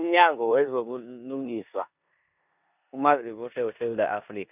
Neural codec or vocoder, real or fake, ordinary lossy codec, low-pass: codec, 16 kHz in and 24 kHz out, 0.9 kbps, LongCat-Audio-Codec, four codebook decoder; fake; none; 3.6 kHz